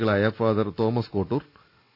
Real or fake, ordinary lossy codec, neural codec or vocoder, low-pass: real; none; none; 5.4 kHz